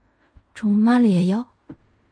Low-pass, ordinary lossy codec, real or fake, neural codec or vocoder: 9.9 kHz; MP3, 48 kbps; fake; codec, 16 kHz in and 24 kHz out, 0.4 kbps, LongCat-Audio-Codec, fine tuned four codebook decoder